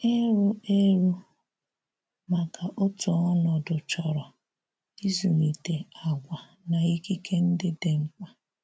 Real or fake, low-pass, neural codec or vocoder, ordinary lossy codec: real; none; none; none